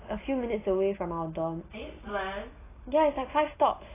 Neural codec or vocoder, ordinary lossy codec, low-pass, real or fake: none; AAC, 16 kbps; 3.6 kHz; real